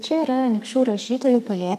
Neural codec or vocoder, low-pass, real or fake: codec, 32 kHz, 1.9 kbps, SNAC; 14.4 kHz; fake